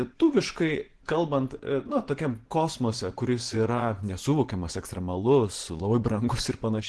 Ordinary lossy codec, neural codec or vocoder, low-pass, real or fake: Opus, 16 kbps; vocoder, 24 kHz, 100 mel bands, Vocos; 10.8 kHz; fake